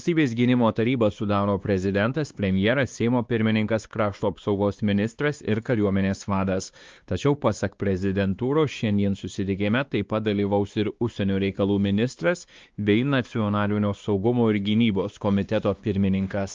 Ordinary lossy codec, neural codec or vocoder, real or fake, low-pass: Opus, 24 kbps; codec, 16 kHz, 2 kbps, X-Codec, WavLM features, trained on Multilingual LibriSpeech; fake; 7.2 kHz